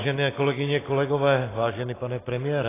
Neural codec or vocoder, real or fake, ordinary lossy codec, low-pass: none; real; AAC, 16 kbps; 3.6 kHz